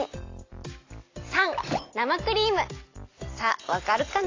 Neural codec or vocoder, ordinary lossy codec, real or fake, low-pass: none; AAC, 32 kbps; real; 7.2 kHz